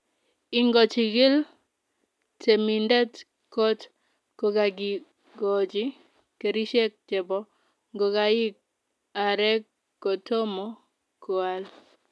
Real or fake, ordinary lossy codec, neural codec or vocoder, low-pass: real; none; none; none